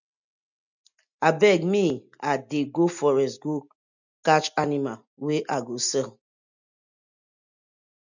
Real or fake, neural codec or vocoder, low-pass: real; none; 7.2 kHz